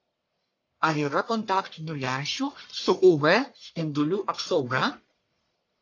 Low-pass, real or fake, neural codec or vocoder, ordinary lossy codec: 7.2 kHz; fake; codec, 44.1 kHz, 1.7 kbps, Pupu-Codec; AAC, 48 kbps